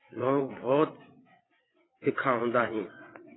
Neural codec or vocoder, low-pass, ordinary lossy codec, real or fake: vocoder, 22.05 kHz, 80 mel bands, WaveNeXt; 7.2 kHz; AAC, 16 kbps; fake